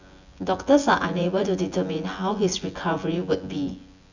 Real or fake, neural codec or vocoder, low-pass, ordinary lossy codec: fake; vocoder, 24 kHz, 100 mel bands, Vocos; 7.2 kHz; none